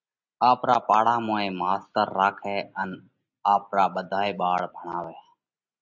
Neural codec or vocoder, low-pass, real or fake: none; 7.2 kHz; real